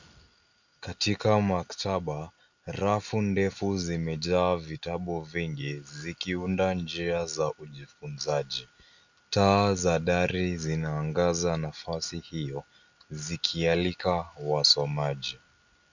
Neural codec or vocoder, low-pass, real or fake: none; 7.2 kHz; real